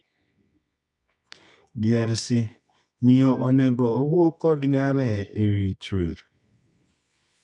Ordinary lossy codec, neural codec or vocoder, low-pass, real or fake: none; codec, 24 kHz, 0.9 kbps, WavTokenizer, medium music audio release; 10.8 kHz; fake